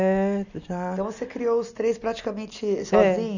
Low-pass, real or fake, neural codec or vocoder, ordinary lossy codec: 7.2 kHz; real; none; none